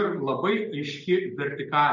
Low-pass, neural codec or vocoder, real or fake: 7.2 kHz; none; real